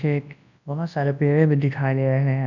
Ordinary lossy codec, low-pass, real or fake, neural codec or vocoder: none; 7.2 kHz; fake; codec, 24 kHz, 0.9 kbps, WavTokenizer, large speech release